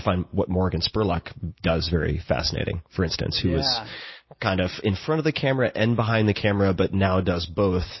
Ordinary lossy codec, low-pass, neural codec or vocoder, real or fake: MP3, 24 kbps; 7.2 kHz; none; real